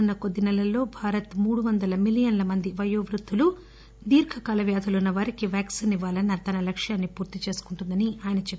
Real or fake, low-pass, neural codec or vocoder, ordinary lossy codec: real; none; none; none